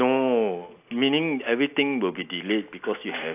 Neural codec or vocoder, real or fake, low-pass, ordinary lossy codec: none; real; 3.6 kHz; AAC, 32 kbps